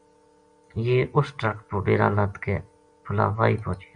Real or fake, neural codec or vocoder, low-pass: real; none; 9.9 kHz